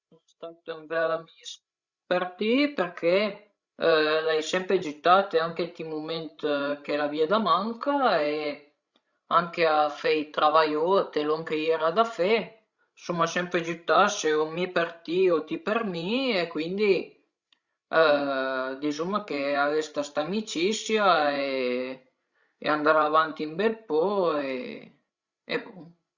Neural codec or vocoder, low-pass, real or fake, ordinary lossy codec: codec, 16 kHz, 16 kbps, FreqCodec, larger model; 7.2 kHz; fake; Opus, 64 kbps